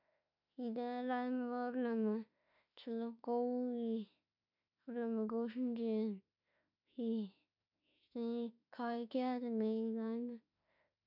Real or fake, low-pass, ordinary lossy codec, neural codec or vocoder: fake; 5.4 kHz; none; autoencoder, 48 kHz, 32 numbers a frame, DAC-VAE, trained on Japanese speech